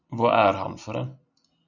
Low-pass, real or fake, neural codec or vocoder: 7.2 kHz; real; none